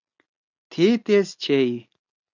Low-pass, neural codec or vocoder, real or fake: 7.2 kHz; none; real